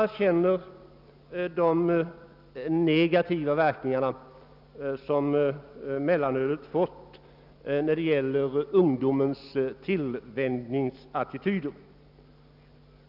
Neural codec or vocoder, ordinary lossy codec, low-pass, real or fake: none; none; 5.4 kHz; real